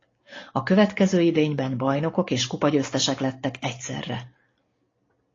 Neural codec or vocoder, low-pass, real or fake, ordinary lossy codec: none; 7.2 kHz; real; AAC, 32 kbps